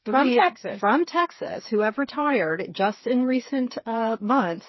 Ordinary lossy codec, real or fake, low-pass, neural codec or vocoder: MP3, 24 kbps; fake; 7.2 kHz; codec, 16 kHz, 4 kbps, FreqCodec, smaller model